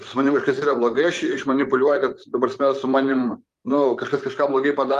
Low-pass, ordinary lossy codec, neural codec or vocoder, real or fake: 14.4 kHz; Opus, 32 kbps; vocoder, 44.1 kHz, 128 mel bands, Pupu-Vocoder; fake